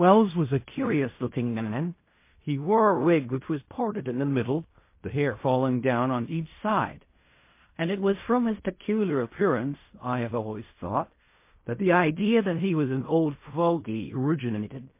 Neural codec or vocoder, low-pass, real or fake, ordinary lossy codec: codec, 16 kHz in and 24 kHz out, 0.4 kbps, LongCat-Audio-Codec, fine tuned four codebook decoder; 3.6 kHz; fake; MP3, 24 kbps